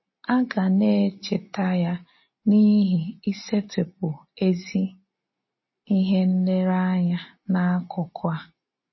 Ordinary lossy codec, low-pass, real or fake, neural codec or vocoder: MP3, 24 kbps; 7.2 kHz; real; none